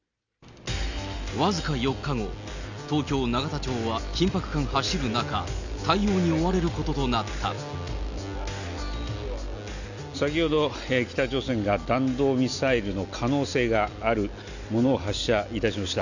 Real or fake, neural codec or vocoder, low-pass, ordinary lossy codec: real; none; 7.2 kHz; none